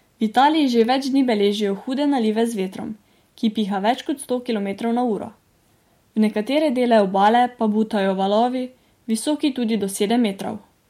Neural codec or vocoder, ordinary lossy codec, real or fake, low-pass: none; MP3, 64 kbps; real; 19.8 kHz